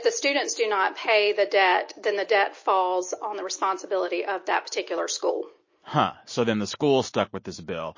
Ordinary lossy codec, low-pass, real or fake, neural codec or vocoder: MP3, 32 kbps; 7.2 kHz; real; none